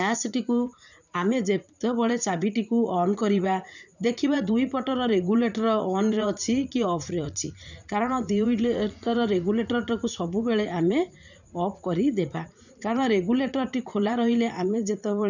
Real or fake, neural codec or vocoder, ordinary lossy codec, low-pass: fake; vocoder, 44.1 kHz, 80 mel bands, Vocos; none; 7.2 kHz